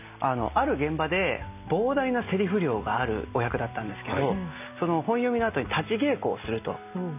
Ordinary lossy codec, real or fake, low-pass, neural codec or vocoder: none; real; 3.6 kHz; none